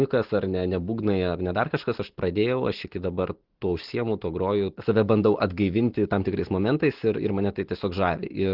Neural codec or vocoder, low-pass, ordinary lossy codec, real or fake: none; 5.4 kHz; Opus, 16 kbps; real